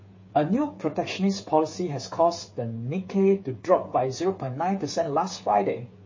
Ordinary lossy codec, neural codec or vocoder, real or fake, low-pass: MP3, 32 kbps; codec, 16 kHz, 8 kbps, FreqCodec, smaller model; fake; 7.2 kHz